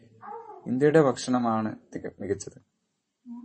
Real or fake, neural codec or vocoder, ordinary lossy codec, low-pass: fake; vocoder, 44.1 kHz, 128 mel bands, Pupu-Vocoder; MP3, 32 kbps; 10.8 kHz